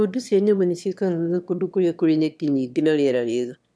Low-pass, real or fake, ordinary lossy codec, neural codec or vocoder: none; fake; none; autoencoder, 22.05 kHz, a latent of 192 numbers a frame, VITS, trained on one speaker